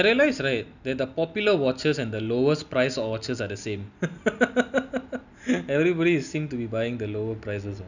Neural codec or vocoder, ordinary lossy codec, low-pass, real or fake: none; none; 7.2 kHz; real